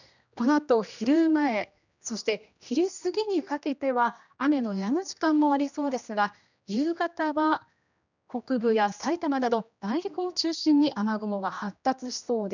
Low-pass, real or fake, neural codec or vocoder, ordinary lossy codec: 7.2 kHz; fake; codec, 16 kHz, 1 kbps, X-Codec, HuBERT features, trained on general audio; none